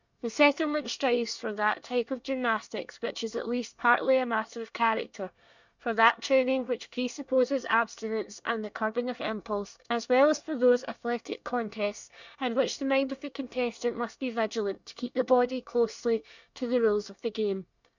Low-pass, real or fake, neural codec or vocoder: 7.2 kHz; fake; codec, 24 kHz, 1 kbps, SNAC